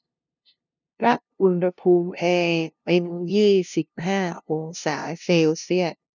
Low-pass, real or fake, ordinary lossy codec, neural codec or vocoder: 7.2 kHz; fake; none; codec, 16 kHz, 0.5 kbps, FunCodec, trained on LibriTTS, 25 frames a second